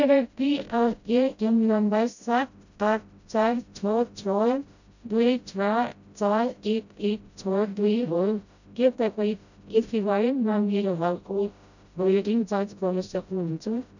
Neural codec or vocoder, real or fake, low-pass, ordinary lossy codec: codec, 16 kHz, 0.5 kbps, FreqCodec, smaller model; fake; 7.2 kHz; none